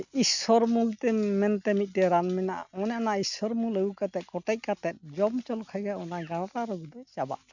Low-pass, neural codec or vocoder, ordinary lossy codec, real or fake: 7.2 kHz; none; none; real